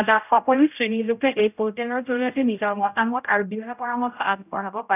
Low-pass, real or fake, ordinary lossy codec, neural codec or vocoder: 3.6 kHz; fake; none; codec, 16 kHz, 0.5 kbps, X-Codec, HuBERT features, trained on general audio